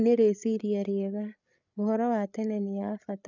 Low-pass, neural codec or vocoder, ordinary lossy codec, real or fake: 7.2 kHz; codec, 16 kHz, 8 kbps, FreqCodec, larger model; none; fake